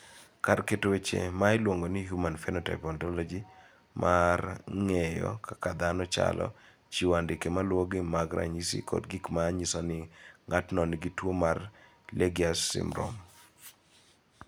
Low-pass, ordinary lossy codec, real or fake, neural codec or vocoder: none; none; real; none